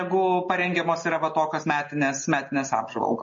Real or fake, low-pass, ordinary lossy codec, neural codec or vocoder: real; 7.2 kHz; MP3, 32 kbps; none